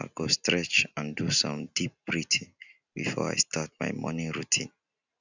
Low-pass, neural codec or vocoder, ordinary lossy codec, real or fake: 7.2 kHz; none; none; real